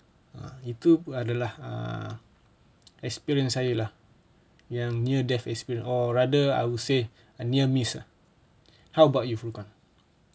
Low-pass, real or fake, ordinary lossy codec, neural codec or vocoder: none; real; none; none